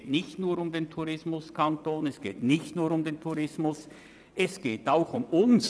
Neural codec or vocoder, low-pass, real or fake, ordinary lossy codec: vocoder, 22.05 kHz, 80 mel bands, WaveNeXt; none; fake; none